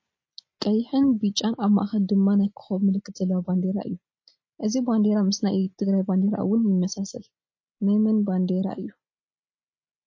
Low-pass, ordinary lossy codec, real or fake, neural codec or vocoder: 7.2 kHz; MP3, 32 kbps; real; none